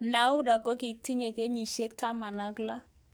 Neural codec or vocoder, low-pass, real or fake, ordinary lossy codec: codec, 44.1 kHz, 2.6 kbps, SNAC; none; fake; none